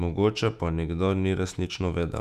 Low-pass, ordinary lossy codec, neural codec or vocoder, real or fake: 14.4 kHz; none; autoencoder, 48 kHz, 128 numbers a frame, DAC-VAE, trained on Japanese speech; fake